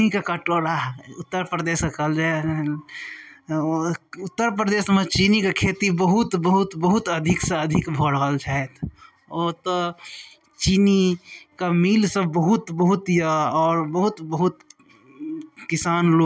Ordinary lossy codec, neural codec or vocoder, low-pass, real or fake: none; none; none; real